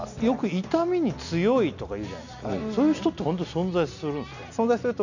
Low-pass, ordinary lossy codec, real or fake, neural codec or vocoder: 7.2 kHz; none; real; none